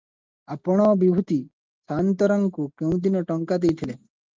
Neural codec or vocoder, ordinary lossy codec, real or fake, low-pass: none; Opus, 32 kbps; real; 7.2 kHz